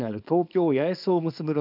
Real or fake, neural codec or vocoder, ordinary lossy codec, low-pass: fake; codec, 24 kHz, 0.9 kbps, WavTokenizer, small release; none; 5.4 kHz